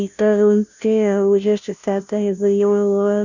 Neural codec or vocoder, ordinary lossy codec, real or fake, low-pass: codec, 16 kHz, 0.5 kbps, FunCodec, trained on Chinese and English, 25 frames a second; none; fake; 7.2 kHz